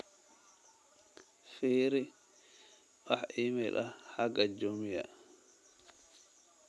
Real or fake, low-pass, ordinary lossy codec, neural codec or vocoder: real; none; none; none